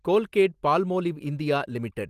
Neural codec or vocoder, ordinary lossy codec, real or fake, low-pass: none; Opus, 24 kbps; real; 14.4 kHz